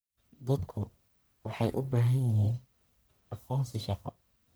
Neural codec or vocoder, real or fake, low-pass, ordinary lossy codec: codec, 44.1 kHz, 1.7 kbps, Pupu-Codec; fake; none; none